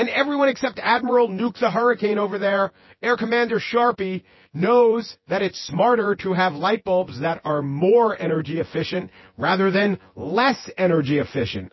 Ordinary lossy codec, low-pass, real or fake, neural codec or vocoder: MP3, 24 kbps; 7.2 kHz; fake; vocoder, 24 kHz, 100 mel bands, Vocos